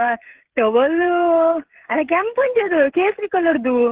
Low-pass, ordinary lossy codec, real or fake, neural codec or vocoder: 3.6 kHz; Opus, 16 kbps; fake; codec, 16 kHz, 8 kbps, FreqCodec, smaller model